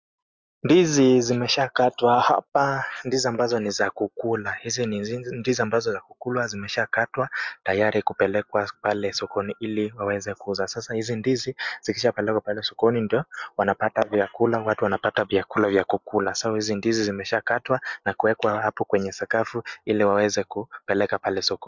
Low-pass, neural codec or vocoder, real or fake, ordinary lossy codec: 7.2 kHz; none; real; MP3, 64 kbps